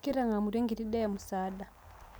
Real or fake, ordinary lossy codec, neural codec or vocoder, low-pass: real; none; none; none